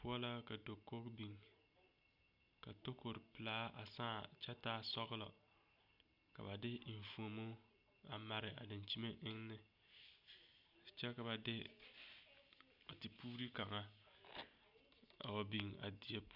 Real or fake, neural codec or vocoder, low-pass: real; none; 5.4 kHz